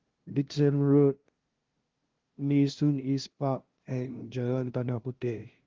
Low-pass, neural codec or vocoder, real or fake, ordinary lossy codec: 7.2 kHz; codec, 16 kHz, 0.5 kbps, FunCodec, trained on LibriTTS, 25 frames a second; fake; Opus, 16 kbps